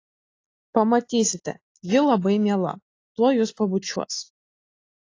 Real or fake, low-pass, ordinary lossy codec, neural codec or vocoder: real; 7.2 kHz; AAC, 32 kbps; none